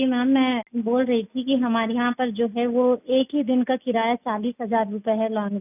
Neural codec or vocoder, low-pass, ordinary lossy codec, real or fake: none; 3.6 kHz; none; real